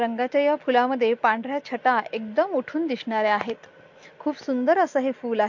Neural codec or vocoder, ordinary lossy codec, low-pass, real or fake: none; MP3, 48 kbps; 7.2 kHz; real